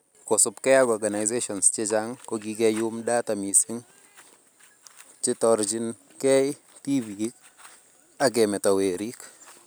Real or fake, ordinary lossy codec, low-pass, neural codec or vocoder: real; none; none; none